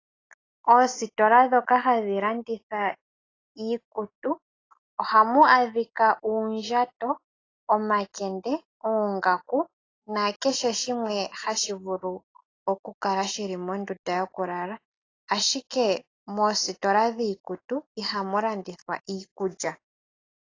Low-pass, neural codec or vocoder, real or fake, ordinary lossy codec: 7.2 kHz; none; real; AAC, 32 kbps